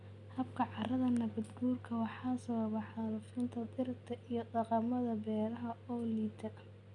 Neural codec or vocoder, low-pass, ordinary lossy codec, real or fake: none; 10.8 kHz; none; real